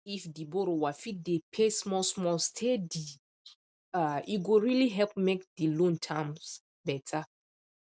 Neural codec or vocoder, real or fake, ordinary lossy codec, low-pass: none; real; none; none